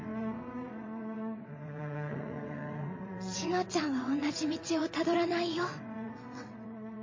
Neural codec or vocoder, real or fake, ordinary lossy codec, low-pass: vocoder, 22.05 kHz, 80 mel bands, WaveNeXt; fake; MP3, 32 kbps; 7.2 kHz